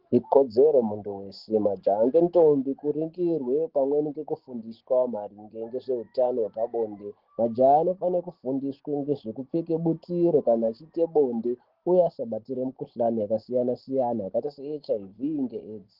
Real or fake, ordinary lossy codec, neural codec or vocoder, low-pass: real; Opus, 16 kbps; none; 5.4 kHz